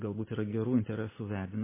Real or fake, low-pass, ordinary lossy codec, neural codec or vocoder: real; 3.6 kHz; MP3, 16 kbps; none